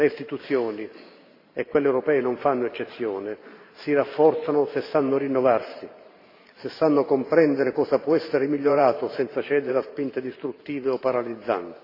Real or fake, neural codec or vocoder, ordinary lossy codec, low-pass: fake; vocoder, 44.1 kHz, 128 mel bands every 512 samples, BigVGAN v2; AAC, 32 kbps; 5.4 kHz